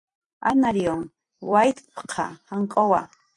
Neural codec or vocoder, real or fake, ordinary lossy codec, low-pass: vocoder, 44.1 kHz, 128 mel bands every 256 samples, BigVGAN v2; fake; AAC, 64 kbps; 10.8 kHz